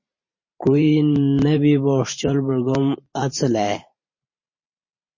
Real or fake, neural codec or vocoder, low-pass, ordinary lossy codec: fake; vocoder, 44.1 kHz, 128 mel bands every 512 samples, BigVGAN v2; 7.2 kHz; MP3, 32 kbps